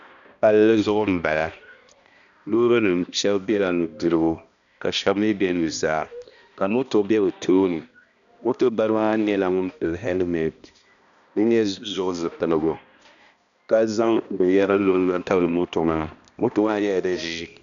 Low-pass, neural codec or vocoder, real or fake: 7.2 kHz; codec, 16 kHz, 1 kbps, X-Codec, HuBERT features, trained on balanced general audio; fake